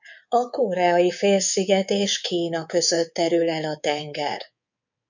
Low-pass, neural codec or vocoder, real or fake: 7.2 kHz; codec, 24 kHz, 3.1 kbps, DualCodec; fake